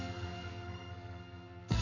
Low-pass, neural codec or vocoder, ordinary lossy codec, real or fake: 7.2 kHz; codec, 44.1 kHz, 2.6 kbps, SNAC; none; fake